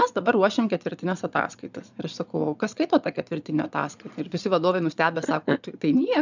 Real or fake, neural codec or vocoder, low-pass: fake; vocoder, 22.05 kHz, 80 mel bands, Vocos; 7.2 kHz